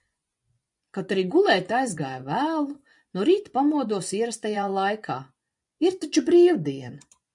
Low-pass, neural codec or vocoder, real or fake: 10.8 kHz; vocoder, 24 kHz, 100 mel bands, Vocos; fake